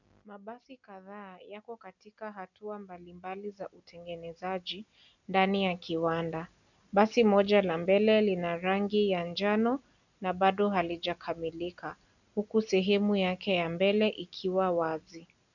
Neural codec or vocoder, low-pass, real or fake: none; 7.2 kHz; real